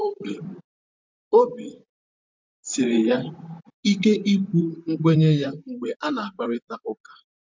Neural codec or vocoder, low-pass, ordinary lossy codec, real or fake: vocoder, 44.1 kHz, 128 mel bands, Pupu-Vocoder; 7.2 kHz; MP3, 64 kbps; fake